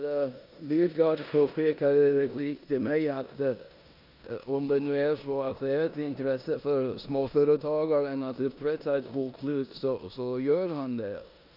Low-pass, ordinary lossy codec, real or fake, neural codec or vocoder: 5.4 kHz; none; fake; codec, 16 kHz in and 24 kHz out, 0.9 kbps, LongCat-Audio-Codec, four codebook decoder